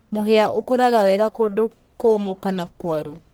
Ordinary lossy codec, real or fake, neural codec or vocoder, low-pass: none; fake; codec, 44.1 kHz, 1.7 kbps, Pupu-Codec; none